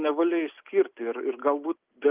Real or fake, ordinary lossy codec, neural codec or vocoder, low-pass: real; Opus, 32 kbps; none; 3.6 kHz